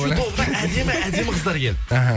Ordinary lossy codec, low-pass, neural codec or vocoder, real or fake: none; none; none; real